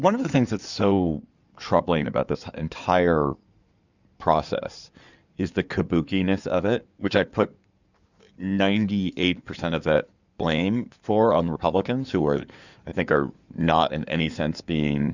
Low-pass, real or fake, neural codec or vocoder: 7.2 kHz; fake; codec, 16 kHz in and 24 kHz out, 2.2 kbps, FireRedTTS-2 codec